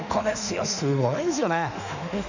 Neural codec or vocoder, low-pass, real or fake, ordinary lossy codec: autoencoder, 48 kHz, 32 numbers a frame, DAC-VAE, trained on Japanese speech; 7.2 kHz; fake; none